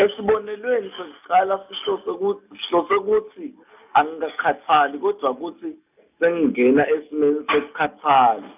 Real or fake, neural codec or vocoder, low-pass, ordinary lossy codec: real; none; 3.6 kHz; none